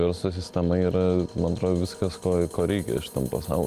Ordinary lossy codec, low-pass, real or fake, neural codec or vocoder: Opus, 32 kbps; 14.4 kHz; real; none